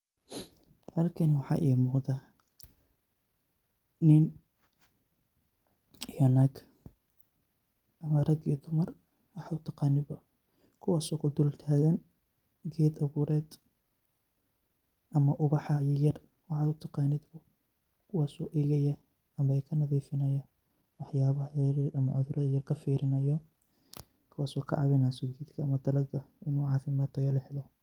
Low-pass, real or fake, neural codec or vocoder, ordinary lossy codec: 19.8 kHz; real; none; Opus, 32 kbps